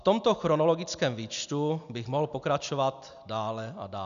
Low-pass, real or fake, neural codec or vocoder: 7.2 kHz; real; none